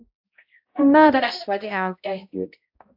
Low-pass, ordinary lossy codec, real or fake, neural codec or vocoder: 5.4 kHz; AAC, 48 kbps; fake; codec, 16 kHz, 0.5 kbps, X-Codec, HuBERT features, trained on balanced general audio